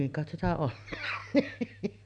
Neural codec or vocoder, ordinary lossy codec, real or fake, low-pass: none; none; real; 9.9 kHz